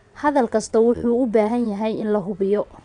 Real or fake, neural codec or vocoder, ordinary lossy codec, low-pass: fake; vocoder, 22.05 kHz, 80 mel bands, WaveNeXt; none; 9.9 kHz